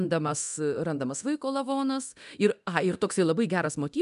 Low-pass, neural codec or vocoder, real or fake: 10.8 kHz; codec, 24 kHz, 0.9 kbps, DualCodec; fake